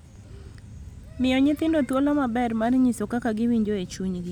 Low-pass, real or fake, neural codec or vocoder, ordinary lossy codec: 19.8 kHz; real; none; none